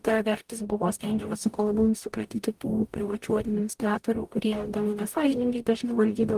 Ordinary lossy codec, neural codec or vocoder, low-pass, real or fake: Opus, 32 kbps; codec, 44.1 kHz, 0.9 kbps, DAC; 14.4 kHz; fake